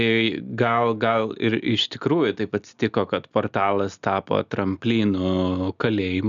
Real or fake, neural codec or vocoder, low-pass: real; none; 7.2 kHz